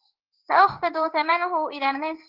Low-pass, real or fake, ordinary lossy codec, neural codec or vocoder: 5.4 kHz; fake; Opus, 16 kbps; codec, 16 kHz in and 24 kHz out, 1 kbps, XY-Tokenizer